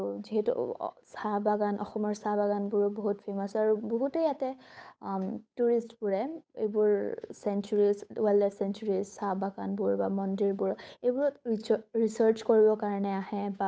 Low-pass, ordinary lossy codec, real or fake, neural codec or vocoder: none; none; fake; codec, 16 kHz, 8 kbps, FunCodec, trained on Chinese and English, 25 frames a second